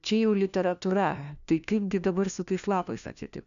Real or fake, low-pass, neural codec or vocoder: fake; 7.2 kHz; codec, 16 kHz, 1 kbps, FunCodec, trained on LibriTTS, 50 frames a second